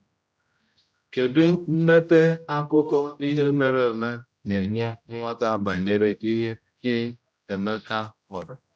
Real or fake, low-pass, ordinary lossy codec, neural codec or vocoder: fake; none; none; codec, 16 kHz, 0.5 kbps, X-Codec, HuBERT features, trained on general audio